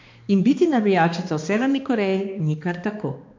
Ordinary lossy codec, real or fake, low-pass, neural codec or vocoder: MP3, 48 kbps; fake; 7.2 kHz; codec, 16 kHz, 2 kbps, X-Codec, HuBERT features, trained on balanced general audio